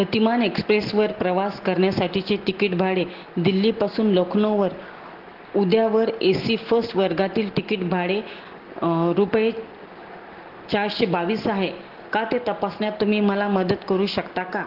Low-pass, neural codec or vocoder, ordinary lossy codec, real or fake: 5.4 kHz; none; Opus, 16 kbps; real